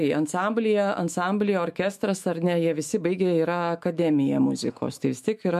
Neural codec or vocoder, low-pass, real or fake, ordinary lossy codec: autoencoder, 48 kHz, 128 numbers a frame, DAC-VAE, trained on Japanese speech; 14.4 kHz; fake; MP3, 64 kbps